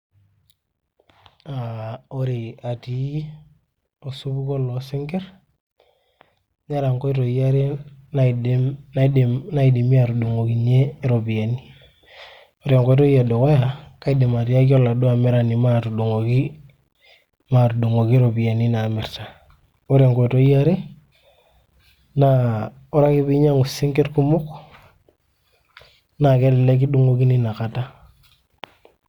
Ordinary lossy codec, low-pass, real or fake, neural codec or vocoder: Opus, 64 kbps; 19.8 kHz; real; none